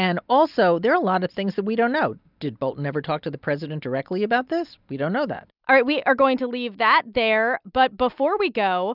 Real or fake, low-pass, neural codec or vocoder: real; 5.4 kHz; none